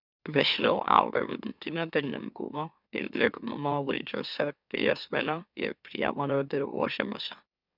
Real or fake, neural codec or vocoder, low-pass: fake; autoencoder, 44.1 kHz, a latent of 192 numbers a frame, MeloTTS; 5.4 kHz